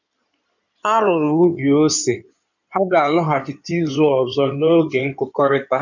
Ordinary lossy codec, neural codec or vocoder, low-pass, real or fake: none; codec, 16 kHz in and 24 kHz out, 2.2 kbps, FireRedTTS-2 codec; 7.2 kHz; fake